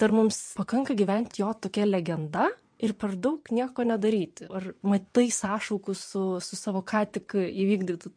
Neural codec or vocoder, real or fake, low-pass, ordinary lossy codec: vocoder, 22.05 kHz, 80 mel bands, WaveNeXt; fake; 9.9 kHz; MP3, 48 kbps